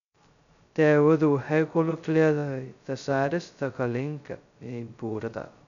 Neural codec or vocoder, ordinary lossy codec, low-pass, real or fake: codec, 16 kHz, 0.2 kbps, FocalCodec; none; 7.2 kHz; fake